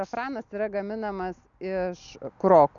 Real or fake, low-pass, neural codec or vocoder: real; 7.2 kHz; none